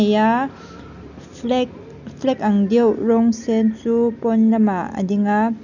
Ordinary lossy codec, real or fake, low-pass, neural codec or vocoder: none; real; 7.2 kHz; none